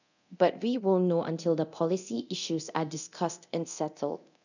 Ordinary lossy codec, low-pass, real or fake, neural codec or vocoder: none; 7.2 kHz; fake; codec, 24 kHz, 0.9 kbps, DualCodec